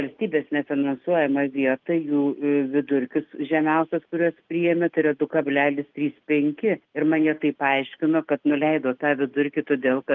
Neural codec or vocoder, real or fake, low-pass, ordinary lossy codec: none; real; 7.2 kHz; Opus, 32 kbps